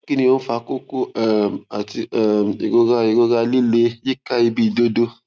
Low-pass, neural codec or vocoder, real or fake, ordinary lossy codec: none; none; real; none